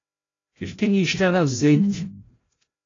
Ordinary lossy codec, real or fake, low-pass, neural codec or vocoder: AAC, 32 kbps; fake; 7.2 kHz; codec, 16 kHz, 0.5 kbps, FreqCodec, larger model